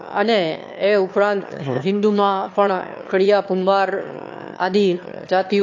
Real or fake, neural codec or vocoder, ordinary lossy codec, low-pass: fake; autoencoder, 22.05 kHz, a latent of 192 numbers a frame, VITS, trained on one speaker; AAC, 48 kbps; 7.2 kHz